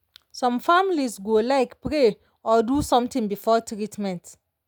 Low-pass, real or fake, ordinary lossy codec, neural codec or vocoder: none; real; none; none